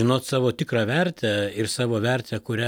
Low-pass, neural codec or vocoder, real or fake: 19.8 kHz; none; real